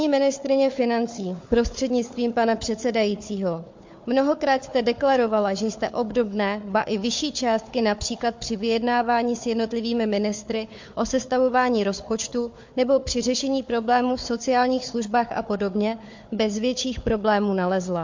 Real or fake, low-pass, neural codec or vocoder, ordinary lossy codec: fake; 7.2 kHz; codec, 16 kHz, 4 kbps, FunCodec, trained on Chinese and English, 50 frames a second; MP3, 48 kbps